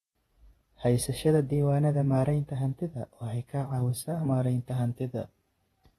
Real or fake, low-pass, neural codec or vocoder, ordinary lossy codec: fake; 19.8 kHz; vocoder, 48 kHz, 128 mel bands, Vocos; AAC, 32 kbps